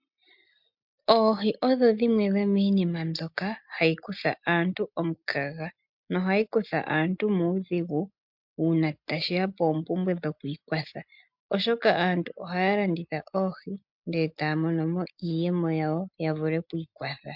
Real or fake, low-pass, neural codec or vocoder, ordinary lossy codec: real; 5.4 kHz; none; MP3, 48 kbps